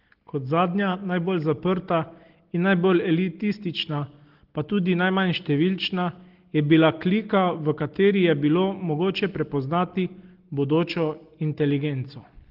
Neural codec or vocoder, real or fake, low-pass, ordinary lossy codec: none; real; 5.4 kHz; Opus, 16 kbps